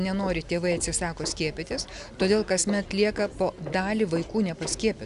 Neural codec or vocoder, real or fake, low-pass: none; real; 10.8 kHz